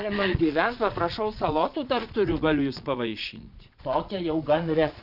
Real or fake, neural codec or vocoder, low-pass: fake; vocoder, 22.05 kHz, 80 mel bands, Vocos; 5.4 kHz